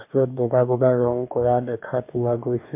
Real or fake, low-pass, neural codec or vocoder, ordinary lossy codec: fake; 3.6 kHz; codec, 44.1 kHz, 2.6 kbps, DAC; MP3, 32 kbps